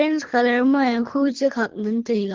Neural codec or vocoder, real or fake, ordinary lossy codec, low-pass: codec, 24 kHz, 3 kbps, HILCodec; fake; Opus, 16 kbps; 7.2 kHz